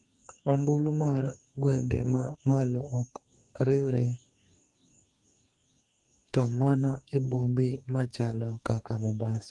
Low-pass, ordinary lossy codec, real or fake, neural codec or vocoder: 10.8 kHz; Opus, 24 kbps; fake; codec, 44.1 kHz, 2.6 kbps, DAC